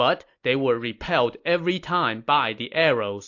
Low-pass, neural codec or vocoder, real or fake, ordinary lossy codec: 7.2 kHz; none; real; AAC, 48 kbps